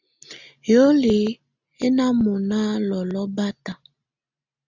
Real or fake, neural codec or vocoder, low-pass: real; none; 7.2 kHz